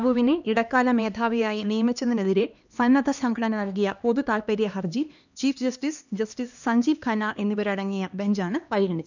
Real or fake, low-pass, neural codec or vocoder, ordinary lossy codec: fake; 7.2 kHz; codec, 16 kHz, 2 kbps, X-Codec, HuBERT features, trained on LibriSpeech; none